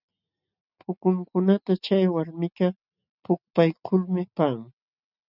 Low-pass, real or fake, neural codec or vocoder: 5.4 kHz; real; none